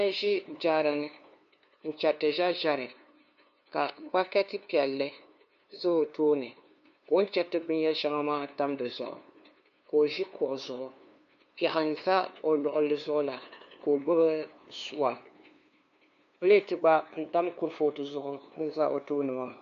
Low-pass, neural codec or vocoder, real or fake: 7.2 kHz; codec, 16 kHz, 2 kbps, FunCodec, trained on LibriTTS, 25 frames a second; fake